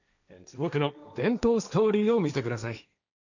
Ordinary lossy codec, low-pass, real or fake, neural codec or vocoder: none; 7.2 kHz; fake; codec, 16 kHz, 1.1 kbps, Voila-Tokenizer